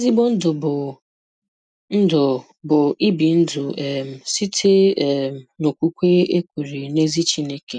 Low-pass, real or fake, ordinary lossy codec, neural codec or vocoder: 9.9 kHz; real; none; none